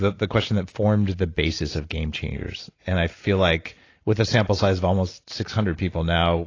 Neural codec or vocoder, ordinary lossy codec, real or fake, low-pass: none; AAC, 32 kbps; real; 7.2 kHz